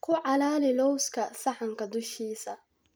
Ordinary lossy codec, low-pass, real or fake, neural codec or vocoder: none; none; real; none